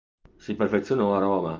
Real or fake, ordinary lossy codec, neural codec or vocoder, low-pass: real; Opus, 32 kbps; none; 7.2 kHz